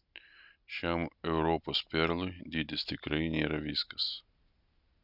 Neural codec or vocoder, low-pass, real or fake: none; 5.4 kHz; real